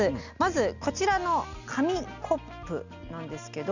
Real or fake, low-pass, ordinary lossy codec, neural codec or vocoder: real; 7.2 kHz; none; none